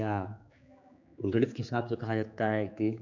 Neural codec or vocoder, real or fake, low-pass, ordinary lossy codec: codec, 16 kHz, 4 kbps, X-Codec, HuBERT features, trained on general audio; fake; 7.2 kHz; none